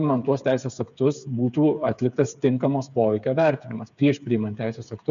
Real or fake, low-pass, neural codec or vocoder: fake; 7.2 kHz; codec, 16 kHz, 8 kbps, FreqCodec, smaller model